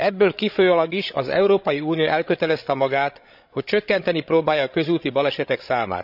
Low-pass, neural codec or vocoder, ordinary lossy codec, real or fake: 5.4 kHz; codec, 16 kHz, 16 kbps, FreqCodec, larger model; none; fake